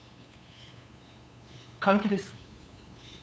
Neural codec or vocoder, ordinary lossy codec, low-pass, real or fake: codec, 16 kHz, 8 kbps, FunCodec, trained on LibriTTS, 25 frames a second; none; none; fake